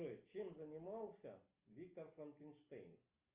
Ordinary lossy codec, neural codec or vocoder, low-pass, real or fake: Opus, 32 kbps; none; 3.6 kHz; real